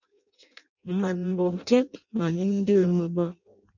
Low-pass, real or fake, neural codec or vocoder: 7.2 kHz; fake; codec, 16 kHz in and 24 kHz out, 0.6 kbps, FireRedTTS-2 codec